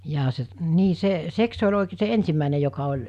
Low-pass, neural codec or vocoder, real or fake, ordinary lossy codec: 14.4 kHz; none; real; none